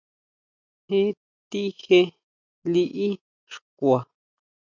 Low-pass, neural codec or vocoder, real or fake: 7.2 kHz; none; real